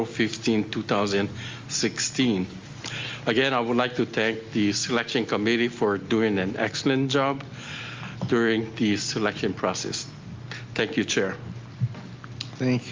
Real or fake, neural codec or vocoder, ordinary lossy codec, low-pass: real; none; Opus, 32 kbps; 7.2 kHz